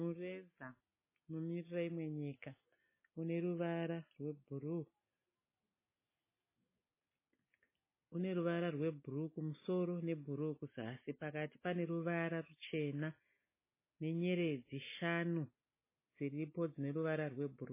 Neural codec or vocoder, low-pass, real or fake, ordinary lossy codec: none; 3.6 kHz; real; MP3, 16 kbps